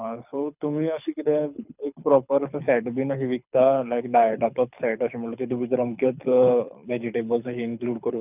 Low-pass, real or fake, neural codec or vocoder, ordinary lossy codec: 3.6 kHz; fake; codec, 24 kHz, 6 kbps, HILCodec; none